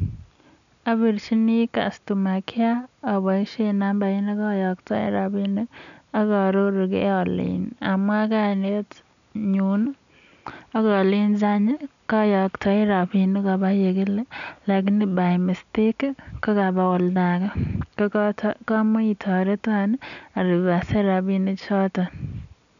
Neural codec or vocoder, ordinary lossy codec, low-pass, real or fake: none; none; 7.2 kHz; real